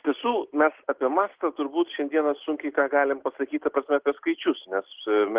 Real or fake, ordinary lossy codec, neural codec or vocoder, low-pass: real; Opus, 16 kbps; none; 3.6 kHz